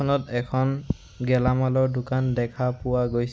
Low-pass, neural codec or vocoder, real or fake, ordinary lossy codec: none; none; real; none